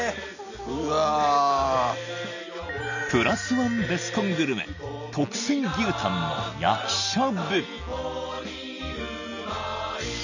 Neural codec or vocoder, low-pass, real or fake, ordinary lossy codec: none; 7.2 kHz; real; none